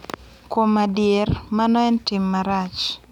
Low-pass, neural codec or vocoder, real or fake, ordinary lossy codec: 19.8 kHz; none; real; none